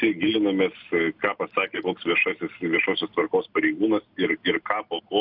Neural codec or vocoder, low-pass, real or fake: none; 5.4 kHz; real